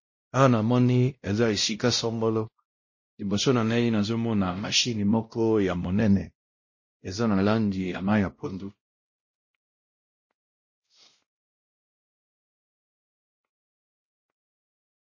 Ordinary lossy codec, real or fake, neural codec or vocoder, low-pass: MP3, 32 kbps; fake; codec, 16 kHz, 0.5 kbps, X-Codec, WavLM features, trained on Multilingual LibriSpeech; 7.2 kHz